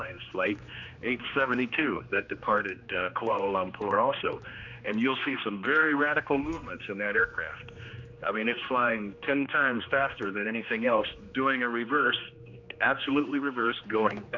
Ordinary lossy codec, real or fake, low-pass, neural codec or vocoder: AAC, 48 kbps; fake; 7.2 kHz; codec, 16 kHz, 2 kbps, X-Codec, HuBERT features, trained on general audio